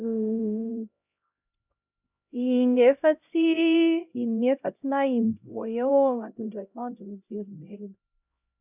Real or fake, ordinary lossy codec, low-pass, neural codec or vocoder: fake; none; 3.6 kHz; codec, 16 kHz, 0.5 kbps, X-Codec, HuBERT features, trained on LibriSpeech